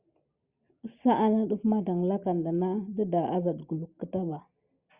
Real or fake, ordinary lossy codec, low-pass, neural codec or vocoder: real; Opus, 64 kbps; 3.6 kHz; none